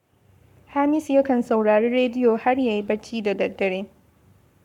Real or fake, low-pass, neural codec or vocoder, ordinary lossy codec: fake; 19.8 kHz; codec, 44.1 kHz, 7.8 kbps, Pupu-Codec; MP3, 96 kbps